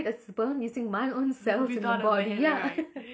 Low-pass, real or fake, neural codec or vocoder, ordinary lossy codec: none; real; none; none